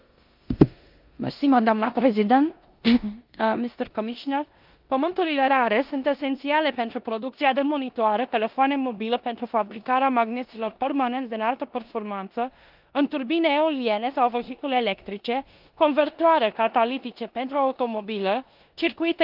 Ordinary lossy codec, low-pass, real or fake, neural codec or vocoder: Opus, 24 kbps; 5.4 kHz; fake; codec, 16 kHz in and 24 kHz out, 0.9 kbps, LongCat-Audio-Codec, four codebook decoder